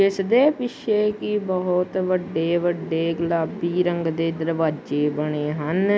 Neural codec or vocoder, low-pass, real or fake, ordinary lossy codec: none; none; real; none